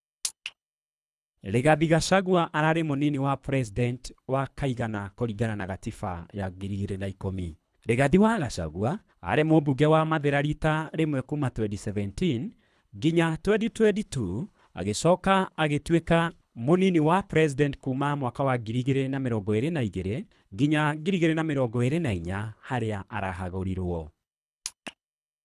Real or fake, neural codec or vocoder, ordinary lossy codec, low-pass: fake; codec, 24 kHz, 3 kbps, HILCodec; none; none